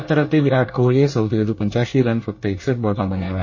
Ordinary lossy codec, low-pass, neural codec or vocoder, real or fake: MP3, 32 kbps; 7.2 kHz; codec, 24 kHz, 1 kbps, SNAC; fake